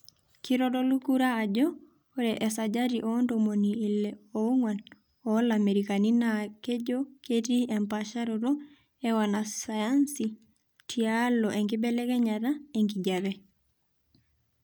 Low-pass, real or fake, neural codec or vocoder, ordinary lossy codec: none; real; none; none